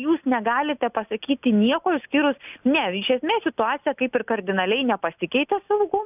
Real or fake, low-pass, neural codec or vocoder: real; 3.6 kHz; none